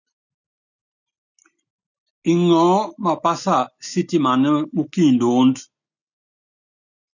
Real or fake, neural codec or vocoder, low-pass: real; none; 7.2 kHz